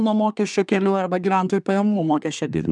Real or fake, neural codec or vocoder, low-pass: fake; codec, 24 kHz, 1 kbps, SNAC; 10.8 kHz